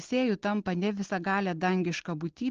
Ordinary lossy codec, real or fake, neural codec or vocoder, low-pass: Opus, 16 kbps; real; none; 7.2 kHz